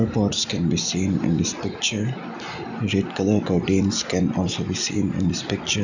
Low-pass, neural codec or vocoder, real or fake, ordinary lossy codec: 7.2 kHz; none; real; none